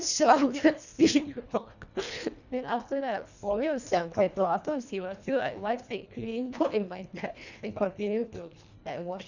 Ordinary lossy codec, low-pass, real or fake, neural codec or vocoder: none; 7.2 kHz; fake; codec, 24 kHz, 1.5 kbps, HILCodec